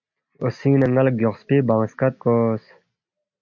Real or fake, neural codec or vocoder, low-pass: real; none; 7.2 kHz